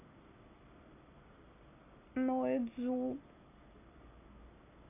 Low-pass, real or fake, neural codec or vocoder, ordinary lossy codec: 3.6 kHz; real; none; none